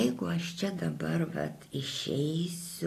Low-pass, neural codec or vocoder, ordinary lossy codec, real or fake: 14.4 kHz; none; AAC, 48 kbps; real